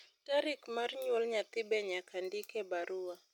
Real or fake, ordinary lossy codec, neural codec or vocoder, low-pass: real; none; none; 19.8 kHz